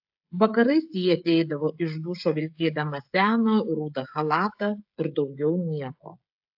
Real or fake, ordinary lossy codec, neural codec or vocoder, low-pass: fake; AAC, 48 kbps; codec, 16 kHz, 16 kbps, FreqCodec, smaller model; 5.4 kHz